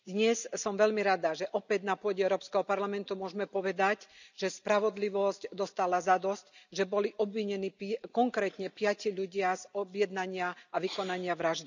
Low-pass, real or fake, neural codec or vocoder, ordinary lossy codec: 7.2 kHz; real; none; none